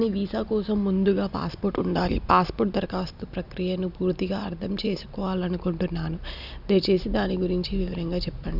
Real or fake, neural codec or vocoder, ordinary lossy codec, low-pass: real; none; none; 5.4 kHz